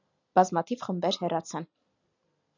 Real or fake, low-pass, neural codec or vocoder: real; 7.2 kHz; none